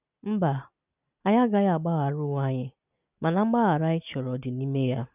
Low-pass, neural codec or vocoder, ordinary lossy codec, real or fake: 3.6 kHz; none; none; real